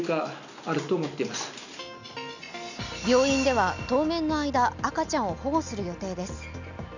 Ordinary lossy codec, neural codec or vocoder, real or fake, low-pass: none; none; real; 7.2 kHz